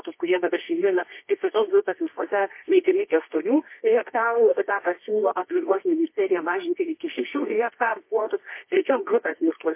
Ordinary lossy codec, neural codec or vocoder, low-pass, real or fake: MP3, 24 kbps; codec, 24 kHz, 0.9 kbps, WavTokenizer, medium music audio release; 3.6 kHz; fake